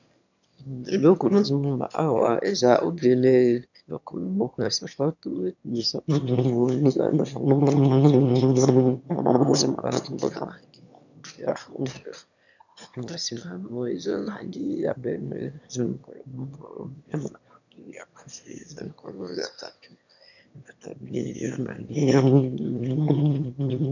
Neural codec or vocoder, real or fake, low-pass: autoencoder, 22.05 kHz, a latent of 192 numbers a frame, VITS, trained on one speaker; fake; 7.2 kHz